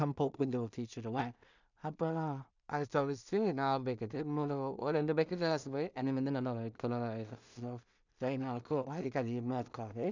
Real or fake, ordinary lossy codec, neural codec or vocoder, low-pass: fake; none; codec, 16 kHz in and 24 kHz out, 0.4 kbps, LongCat-Audio-Codec, two codebook decoder; 7.2 kHz